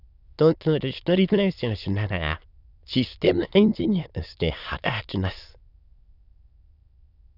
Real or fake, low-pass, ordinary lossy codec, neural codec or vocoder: fake; 5.4 kHz; none; autoencoder, 22.05 kHz, a latent of 192 numbers a frame, VITS, trained on many speakers